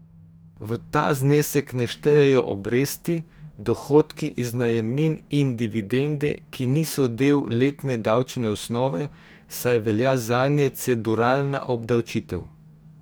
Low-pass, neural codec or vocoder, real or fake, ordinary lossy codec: none; codec, 44.1 kHz, 2.6 kbps, DAC; fake; none